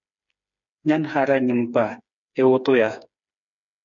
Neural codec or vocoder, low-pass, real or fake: codec, 16 kHz, 4 kbps, FreqCodec, smaller model; 7.2 kHz; fake